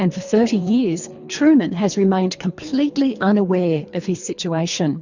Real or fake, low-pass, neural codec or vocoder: fake; 7.2 kHz; codec, 24 kHz, 3 kbps, HILCodec